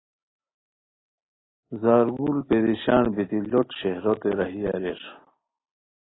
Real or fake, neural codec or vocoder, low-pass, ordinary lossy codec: real; none; 7.2 kHz; AAC, 16 kbps